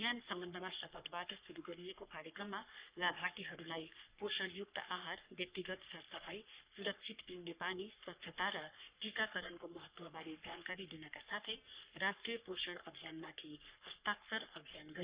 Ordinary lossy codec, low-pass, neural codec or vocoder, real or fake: Opus, 64 kbps; 3.6 kHz; codec, 44.1 kHz, 3.4 kbps, Pupu-Codec; fake